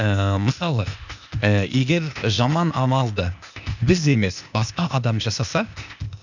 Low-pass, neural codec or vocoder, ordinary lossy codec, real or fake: 7.2 kHz; codec, 16 kHz, 0.8 kbps, ZipCodec; none; fake